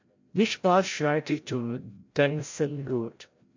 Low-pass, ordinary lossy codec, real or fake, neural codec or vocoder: 7.2 kHz; MP3, 48 kbps; fake; codec, 16 kHz, 0.5 kbps, FreqCodec, larger model